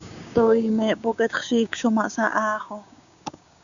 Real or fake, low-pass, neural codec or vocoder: fake; 7.2 kHz; codec, 16 kHz, 6 kbps, DAC